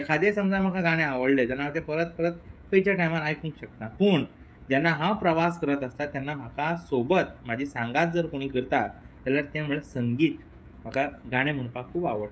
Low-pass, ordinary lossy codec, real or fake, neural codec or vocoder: none; none; fake; codec, 16 kHz, 16 kbps, FreqCodec, smaller model